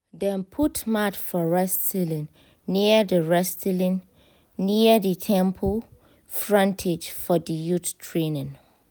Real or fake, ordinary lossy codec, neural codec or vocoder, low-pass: real; none; none; none